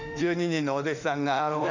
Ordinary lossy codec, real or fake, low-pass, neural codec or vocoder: none; fake; 7.2 kHz; autoencoder, 48 kHz, 32 numbers a frame, DAC-VAE, trained on Japanese speech